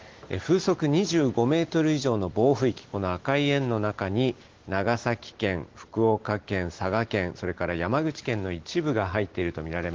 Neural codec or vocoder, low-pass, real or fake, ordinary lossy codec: none; 7.2 kHz; real; Opus, 16 kbps